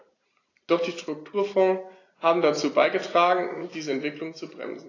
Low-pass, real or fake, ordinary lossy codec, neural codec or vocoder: 7.2 kHz; real; AAC, 32 kbps; none